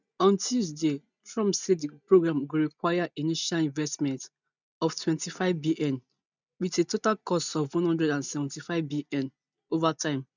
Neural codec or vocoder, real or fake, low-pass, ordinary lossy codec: none; real; 7.2 kHz; none